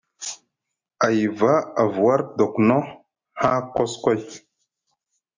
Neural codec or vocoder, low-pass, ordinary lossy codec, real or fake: none; 7.2 kHz; MP3, 48 kbps; real